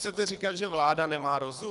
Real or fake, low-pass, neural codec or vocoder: fake; 10.8 kHz; codec, 24 kHz, 3 kbps, HILCodec